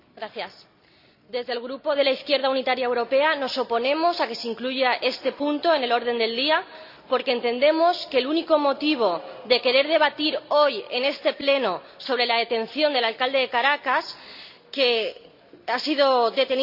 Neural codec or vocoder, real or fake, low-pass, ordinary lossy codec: none; real; 5.4 kHz; none